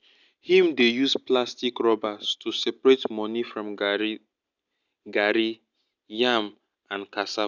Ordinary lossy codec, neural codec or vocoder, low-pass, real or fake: none; none; 7.2 kHz; real